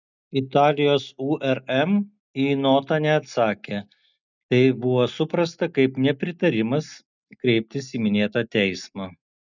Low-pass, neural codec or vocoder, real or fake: 7.2 kHz; none; real